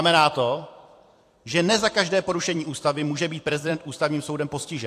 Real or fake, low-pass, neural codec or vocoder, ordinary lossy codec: fake; 14.4 kHz; vocoder, 44.1 kHz, 128 mel bands every 256 samples, BigVGAN v2; AAC, 64 kbps